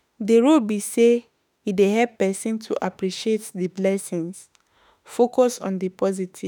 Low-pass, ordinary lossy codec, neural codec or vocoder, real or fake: none; none; autoencoder, 48 kHz, 32 numbers a frame, DAC-VAE, trained on Japanese speech; fake